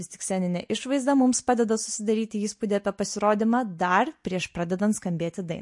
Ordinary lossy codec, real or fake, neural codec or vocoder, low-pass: MP3, 48 kbps; real; none; 10.8 kHz